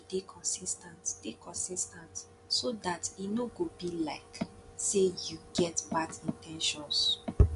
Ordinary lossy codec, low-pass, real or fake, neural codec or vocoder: none; 10.8 kHz; real; none